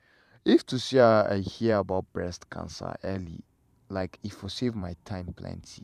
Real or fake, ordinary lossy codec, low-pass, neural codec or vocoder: fake; none; 14.4 kHz; vocoder, 44.1 kHz, 128 mel bands every 512 samples, BigVGAN v2